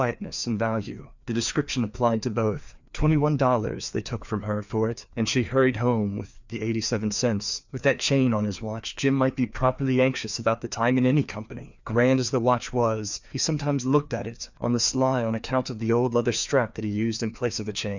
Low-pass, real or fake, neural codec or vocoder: 7.2 kHz; fake; codec, 16 kHz, 2 kbps, FreqCodec, larger model